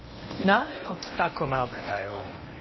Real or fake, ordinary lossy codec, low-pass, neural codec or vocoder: fake; MP3, 24 kbps; 7.2 kHz; codec, 16 kHz in and 24 kHz out, 0.8 kbps, FocalCodec, streaming, 65536 codes